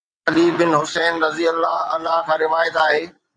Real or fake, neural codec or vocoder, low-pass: fake; vocoder, 22.05 kHz, 80 mel bands, WaveNeXt; 9.9 kHz